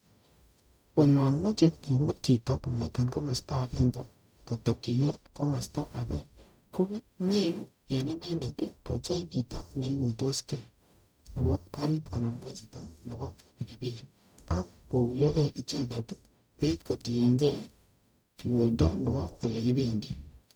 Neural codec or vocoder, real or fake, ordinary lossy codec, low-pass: codec, 44.1 kHz, 0.9 kbps, DAC; fake; none; none